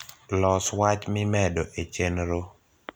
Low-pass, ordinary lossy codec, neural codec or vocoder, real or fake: none; none; none; real